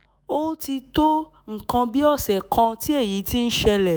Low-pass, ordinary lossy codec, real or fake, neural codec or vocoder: none; none; fake; autoencoder, 48 kHz, 128 numbers a frame, DAC-VAE, trained on Japanese speech